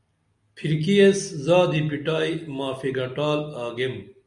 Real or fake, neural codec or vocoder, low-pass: real; none; 10.8 kHz